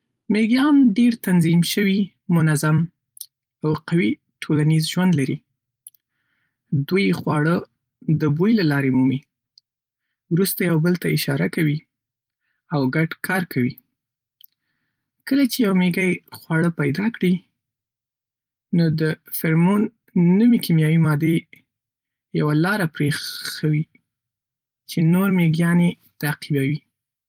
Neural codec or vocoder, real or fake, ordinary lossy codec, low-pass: vocoder, 44.1 kHz, 128 mel bands every 256 samples, BigVGAN v2; fake; Opus, 32 kbps; 19.8 kHz